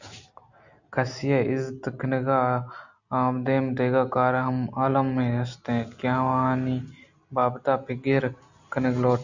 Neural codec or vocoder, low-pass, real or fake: none; 7.2 kHz; real